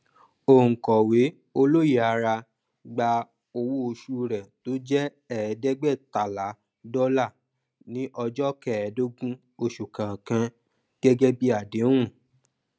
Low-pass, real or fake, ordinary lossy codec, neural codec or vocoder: none; real; none; none